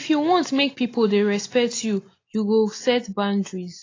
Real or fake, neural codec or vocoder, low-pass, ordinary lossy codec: real; none; 7.2 kHz; AAC, 32 kbps